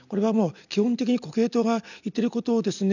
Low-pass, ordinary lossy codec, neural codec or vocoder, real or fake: 7.2 kHz; none; none; real